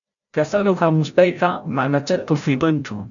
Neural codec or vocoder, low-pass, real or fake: codec, 16 kHz, 0.5 kbps, FreqCodec, larger model; 7.2 kHz; fake